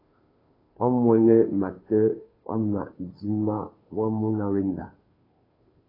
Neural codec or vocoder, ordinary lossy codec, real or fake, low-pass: codec, 16 kHz, 2 kbps, FunCodec, trained on Chinese and English, 25 frames a second; AAC, 24 kbps; fake; 5.4 kHz